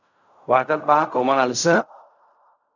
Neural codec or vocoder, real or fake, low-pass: codec, 16 kHz in and 24 kHz out, 0.4 kbps, LongCat-Audio-Codec, fine tuned four codebook decoder; fake; 7.2 kHz